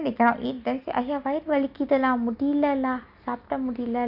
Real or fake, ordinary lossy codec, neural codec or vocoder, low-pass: real; none; none; 5.4 kHz